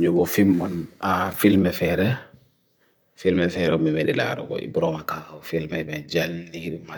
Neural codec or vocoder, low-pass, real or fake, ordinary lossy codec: vocoder, 44.1 kHz, 128 mel bands, Pupu-Vocoder; none; fake; none